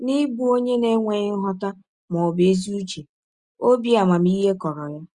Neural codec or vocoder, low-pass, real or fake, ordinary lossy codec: none; 10.8 kHz; real; Opus, 64 kbps